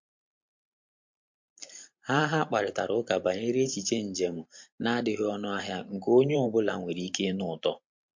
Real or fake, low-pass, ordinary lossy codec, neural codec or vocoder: fake; 7.2 kHz; MP3, 48 kbps; vocoder, 44.1 kHz, 128 mel bands every 512 samples, BigVGAN v2